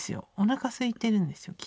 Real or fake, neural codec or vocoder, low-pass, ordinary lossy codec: real; none; none; none